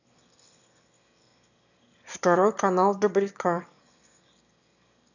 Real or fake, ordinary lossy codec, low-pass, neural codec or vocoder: fake; none; 7.2 kHz; autoencoder, 22.05 kHz, a latent of 192 numbers a frame, VITS, trained on one speaker